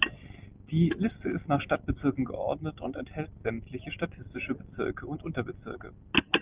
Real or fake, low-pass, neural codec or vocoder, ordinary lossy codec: real; 3.6 kHz; none; Opus, 24 kbps